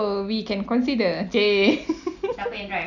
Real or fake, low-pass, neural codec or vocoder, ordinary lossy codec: real; 7.2 kHz; none; none